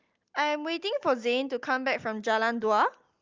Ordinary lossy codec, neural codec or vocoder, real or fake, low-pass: Opus, 24 kbps; none; real; 7.2 kHz